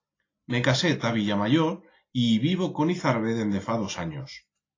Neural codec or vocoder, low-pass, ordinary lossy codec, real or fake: none; 7.2 kHz; AAC, 32 kbps; real